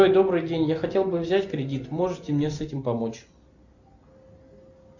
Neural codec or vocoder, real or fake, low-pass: none; real; 7.2 kHz